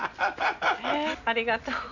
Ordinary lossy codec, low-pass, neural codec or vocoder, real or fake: none; 7.2 kHz; none; real